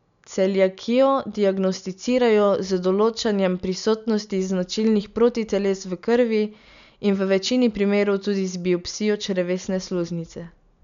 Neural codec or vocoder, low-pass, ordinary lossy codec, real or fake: none; 7.2 kHz; none; real